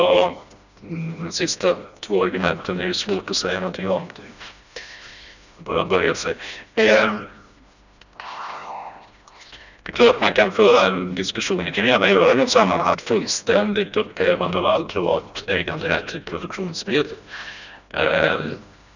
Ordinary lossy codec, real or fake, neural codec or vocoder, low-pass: none; fake; codec, 16 kHz, 1 kbps, FreqCodec, smaller model; 7.2 kHz